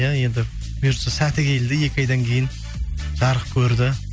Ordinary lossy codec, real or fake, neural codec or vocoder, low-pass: none; real; none; none